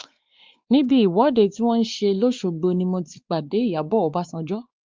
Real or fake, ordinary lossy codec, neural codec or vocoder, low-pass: fake; Opus, 32 kbps; codec, 16 kHz, 4 kbps, X-Codec, WavLM features, trained on Multilingual LibriSpeech; 7.2 kHz